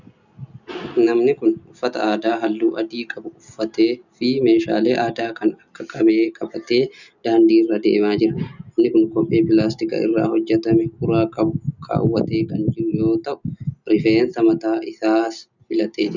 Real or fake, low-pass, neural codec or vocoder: real; 7.2 kHz; none